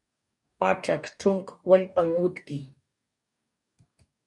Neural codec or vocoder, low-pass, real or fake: codec, 44.1 kHz, 2.6 kbps, DAC; 10.8 kHz; fake